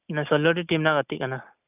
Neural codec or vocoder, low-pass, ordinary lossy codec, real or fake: none; 3.6 kHz; none; real